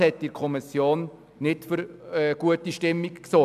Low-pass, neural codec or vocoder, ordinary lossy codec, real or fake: 14.4 kHz; none; none; real